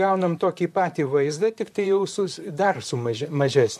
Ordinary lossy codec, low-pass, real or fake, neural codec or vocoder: MP3, 64 kbps; 14.4 kHz; fake; vocoder, 44.1 kHz, 128 mel bands, Pupu-Vocoder